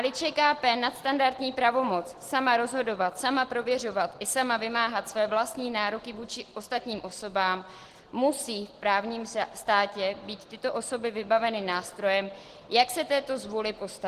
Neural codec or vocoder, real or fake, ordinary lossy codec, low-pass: none; real; Opus, 16 kbps; 14.4 kHz